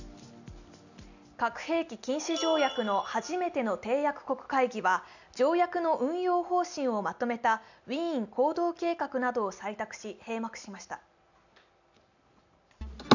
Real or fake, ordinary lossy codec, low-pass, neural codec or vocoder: real; none; 7.2 kHz; none